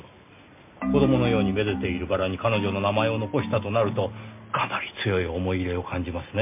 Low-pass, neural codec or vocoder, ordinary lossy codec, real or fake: 3.6 kHz; none; MP3, 32 kbps; real